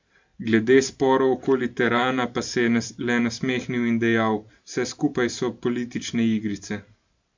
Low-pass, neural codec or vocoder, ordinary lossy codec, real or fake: 7.2 kHz; vocoder, 44.1 kHz, 128 mel bands every 512 samples, BigVGAN v2; MP3, 64 kbps; fake